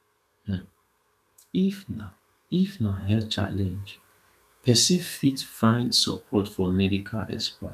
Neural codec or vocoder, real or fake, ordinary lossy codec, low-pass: codec, 32 kHz, 1.9 kbps, SNAC; fake; none; 14.4 kHz